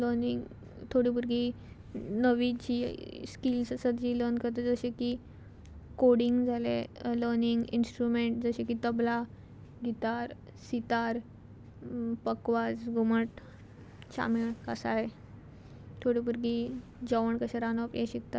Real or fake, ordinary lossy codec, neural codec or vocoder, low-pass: real; none; none; none